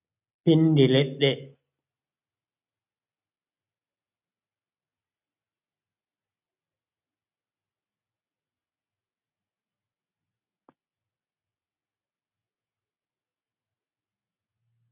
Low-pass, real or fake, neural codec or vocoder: 3.6 kHz; real; none